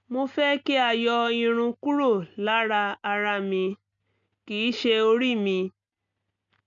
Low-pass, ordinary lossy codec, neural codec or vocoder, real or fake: 7.2 kHz; MP3, 64 kbps; none; real